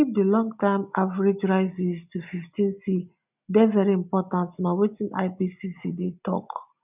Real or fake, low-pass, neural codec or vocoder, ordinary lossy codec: real; 3.6 kHz; none; none